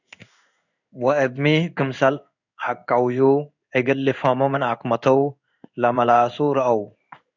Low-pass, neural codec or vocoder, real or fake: 7.2 kHz; codec, 16 kHz in and 24 kHz out, 1 kbps, XY-Tokenizer; fake